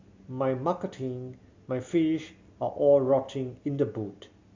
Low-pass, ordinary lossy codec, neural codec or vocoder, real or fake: 7.2 kHz; MP3, 48 kbps; none; real